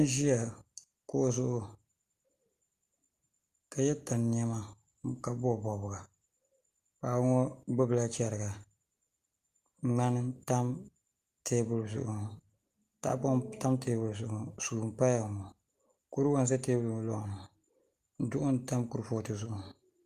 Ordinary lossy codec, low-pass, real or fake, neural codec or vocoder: Opus, 32 kbps; 14.4 kHz; real; none